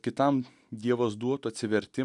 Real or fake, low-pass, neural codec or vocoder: real; 10.8 kHz; none